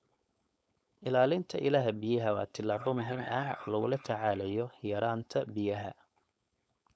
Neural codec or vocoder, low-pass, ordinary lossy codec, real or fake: codec, 16 kHz, 4.8 kbps, FACodec; none; none; fake